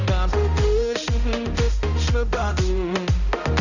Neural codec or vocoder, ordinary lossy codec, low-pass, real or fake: codec, 16 kHz, 0.5 kbps, X-Codec, HuBERT features, trained on balanced general audio; none; 7.2 kHz; fake